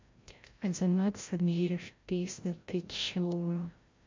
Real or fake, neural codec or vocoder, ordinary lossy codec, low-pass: fake; codec, 16 kHz, 0.5 kbps, FreqCodec, larger model; MP3, 48 kbps; 7.2 kHz